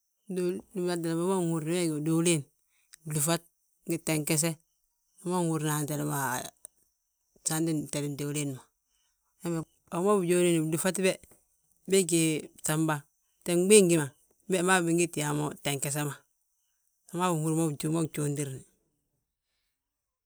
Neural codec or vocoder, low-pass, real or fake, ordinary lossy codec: none; none; real; none